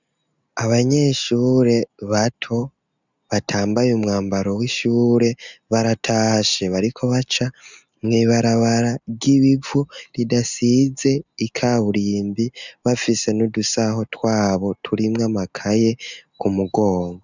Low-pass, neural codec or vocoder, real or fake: 7.2 kHz; none; real